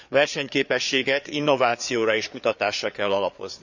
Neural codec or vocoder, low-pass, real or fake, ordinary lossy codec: codec, 16 kHz, 4 kbps, FreqCodec, larger model; 7.2 kHz; fake; none